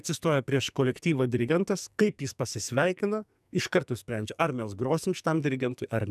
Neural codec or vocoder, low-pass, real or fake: codec, 44.1 kHz, 2.6 kbps, SNAC; 14.4 kHz; fake